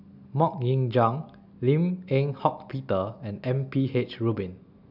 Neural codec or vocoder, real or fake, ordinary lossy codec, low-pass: none; real; none; 5.4 kHz